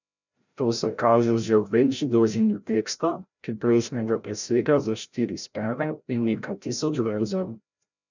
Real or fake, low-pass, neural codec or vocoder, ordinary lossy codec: fake; 7.2 kHz; codec, 16 kHz, 0.5 kbps, FreqCodec, larger model; none